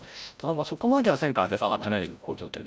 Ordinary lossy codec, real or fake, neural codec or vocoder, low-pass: none; fake; codec, 16 kHz, 0.5 kbps, FreqCodec, larger model; none